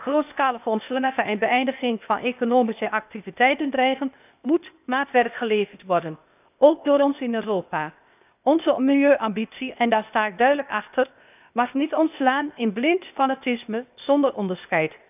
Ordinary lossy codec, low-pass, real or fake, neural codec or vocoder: none; 3.6 kHz; fake; codec, 16 kHz, 0.8 kbps, ZipCodec